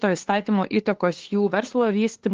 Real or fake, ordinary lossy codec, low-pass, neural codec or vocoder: fake; Opus, 16 kbps; 7.2 kHz; codec, 16 kHz, 2 kbps, FunCodec, trained on LibriTTS, 25 frames a second